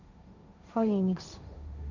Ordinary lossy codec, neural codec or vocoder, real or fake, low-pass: none; codec, 16 kHz, 1.1 kbps, Voila-Tokenizer; fake; 7.2 kHz